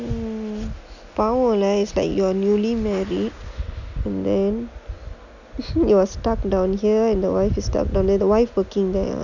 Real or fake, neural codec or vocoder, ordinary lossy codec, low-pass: real; none; none; 7.2 kHz